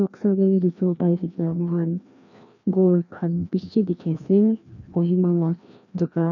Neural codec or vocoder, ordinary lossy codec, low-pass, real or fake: codec, 16 kHz, 1 kbps, FreqCodec, larger model; none; 7.2 kHz; fake